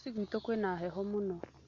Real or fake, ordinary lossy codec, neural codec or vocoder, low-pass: real; none; none; 7.2 kHz